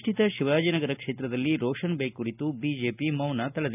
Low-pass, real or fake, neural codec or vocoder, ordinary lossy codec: 3.6 kHz; real; none; none